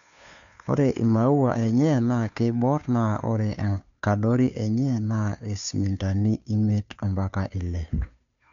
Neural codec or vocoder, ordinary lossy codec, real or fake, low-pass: codec, 16 kHz, 2 kbps, FunCodec, trained on Chinese and English, 25 frames a second; none; fake; 7.2 kHz